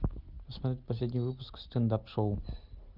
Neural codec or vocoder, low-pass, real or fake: none; 5.4 kHz; real